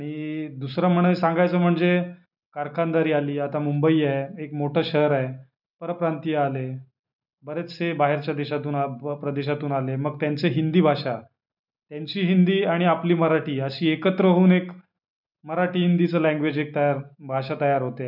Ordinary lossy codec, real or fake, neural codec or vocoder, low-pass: none; real; none; 5.4 kHz